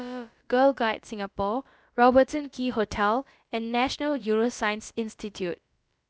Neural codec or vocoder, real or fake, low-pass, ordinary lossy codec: codec, 16 kHz, about 1 kbps, DyCAST, with the encoder's durations; fake; none; none